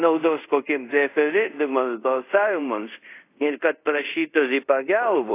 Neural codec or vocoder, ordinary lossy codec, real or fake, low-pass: codec, 24 kHz, 0.5 kbps, DualCodec; AAC, 24 kbps; fake; 3.6 kHz